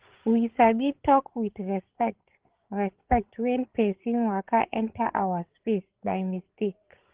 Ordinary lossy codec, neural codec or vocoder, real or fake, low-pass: Opus, 16 kbps; codec, 16 kHz, 16 kbps, FreqCodec, larger model; fake; 3.6 kHz